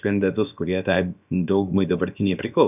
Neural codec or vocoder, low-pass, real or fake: codec, 16 kHz, about 1 kbps, DyCAST, with the encoder's durations; 3.6 kHz; fake